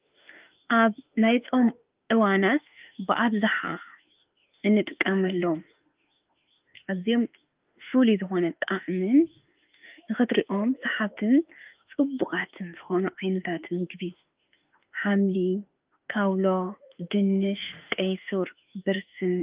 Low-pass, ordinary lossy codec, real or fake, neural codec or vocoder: 3.6 kHz; Opus, 32 kbps; fake; autoencoder, 48 kHz, 32 numbers a frame, DAC-VAE, trained on Japanese speech